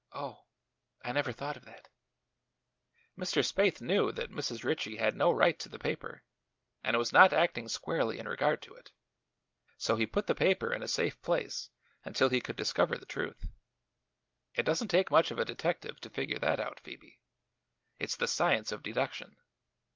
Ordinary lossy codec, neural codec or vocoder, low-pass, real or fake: Opus, 24 kbps; none; 7.2 kHz; real